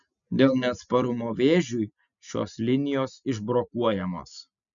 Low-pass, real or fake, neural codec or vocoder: 7.2 kHz; real; none